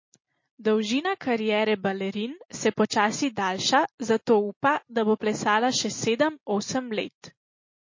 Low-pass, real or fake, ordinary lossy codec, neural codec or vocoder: 7.2 kHz; real; MP3, 32 kbps; none